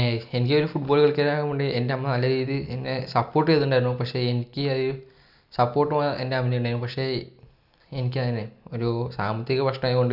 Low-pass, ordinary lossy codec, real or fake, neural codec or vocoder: 5.4 kHz; none; real; none